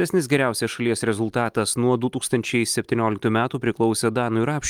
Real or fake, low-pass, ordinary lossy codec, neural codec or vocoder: real; 19.8 kHz; Opus, 32 kbps; none